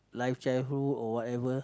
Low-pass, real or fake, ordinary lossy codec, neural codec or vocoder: none; real; none; none